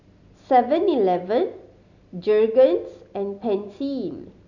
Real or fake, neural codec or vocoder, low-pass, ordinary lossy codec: real; none; 7.2 kHz; none